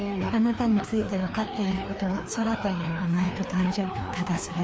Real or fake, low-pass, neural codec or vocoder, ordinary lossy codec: fake; none; codec, 16 kHz, 2 kbps, FreqCodec, larger model; none